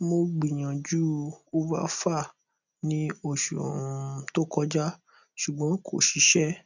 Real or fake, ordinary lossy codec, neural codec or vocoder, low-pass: real; none; none; 7.2 kHz